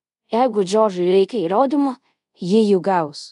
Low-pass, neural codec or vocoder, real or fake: 10.8 kHz; codec, 24 kHz, 0.5 kbps, DualCodec; fake